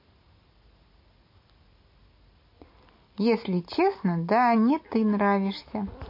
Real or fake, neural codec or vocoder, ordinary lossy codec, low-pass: real; none; MP3, 32 kbps; 5.4 kHz